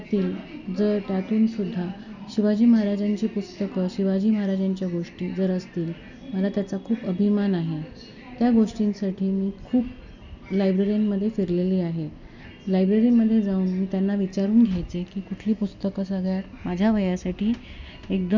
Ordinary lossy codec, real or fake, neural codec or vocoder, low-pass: none; real; none; 7.2 kHz